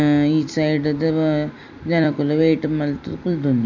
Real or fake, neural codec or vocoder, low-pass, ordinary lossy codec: fake; vocoder, 44.1 kHz, 128 mel bands every 256 samples, BigVGAN v2; 7.2 kHz; none